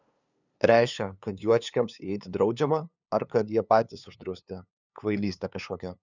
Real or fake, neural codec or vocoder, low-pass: fake; codec, 16 kHz, 2 kbps, FunCodec, trained on LibriTTS, 25 frames a second; 7.2 kHz